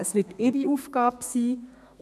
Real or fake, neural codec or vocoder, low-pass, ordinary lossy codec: fake; codec, 32 kHz, 1.9 kbps, SNAC; 14.4 kHz; none